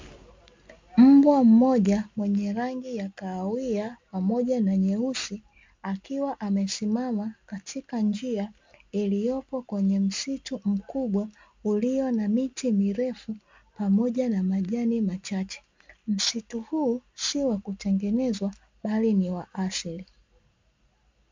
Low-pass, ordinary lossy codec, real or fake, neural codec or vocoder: 7.2 kHz; MP3, 64 kbps; real; none